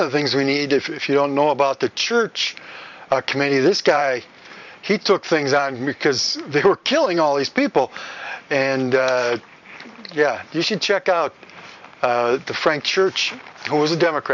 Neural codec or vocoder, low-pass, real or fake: vocoder, 44.1 kHz, 128 mel bands every 512 samples, BigVGAN v2; 7.2 kHz; fake